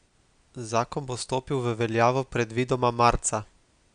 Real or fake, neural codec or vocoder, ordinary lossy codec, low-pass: real; none; none; 9.9 kHz